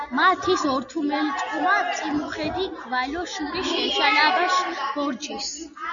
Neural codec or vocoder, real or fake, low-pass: none; real; 7.2 kHz